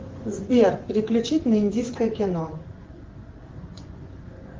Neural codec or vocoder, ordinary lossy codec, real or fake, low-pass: vocoder, 24 kHz, 100 mel bands, Vocos; Opus, 16 kbps; fake; 7.2 kHz